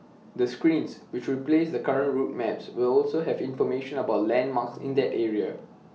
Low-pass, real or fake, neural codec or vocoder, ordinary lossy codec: none; real; none; none